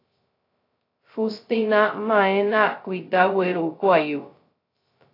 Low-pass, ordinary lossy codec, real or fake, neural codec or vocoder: 5.4 kHz; AAC, 32 kbps; fake; codec, 16 kHz, 0.2 kbps, FocalCodec